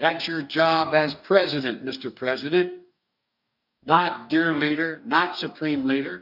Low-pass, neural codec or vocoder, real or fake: 5.4 kHz; codec, 44.1 kHz, 2.6 kbps, DAC; fake